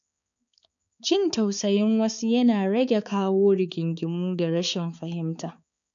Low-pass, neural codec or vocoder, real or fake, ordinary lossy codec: 7.2 kHz; codec, 16 kHz, 4 kbps, X-Codec, HuBERT features, trained on balanced general audio; fake; none